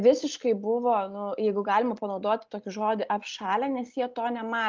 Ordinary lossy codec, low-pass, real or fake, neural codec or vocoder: Opus, 24 kbps; 7.2 kHz; real; none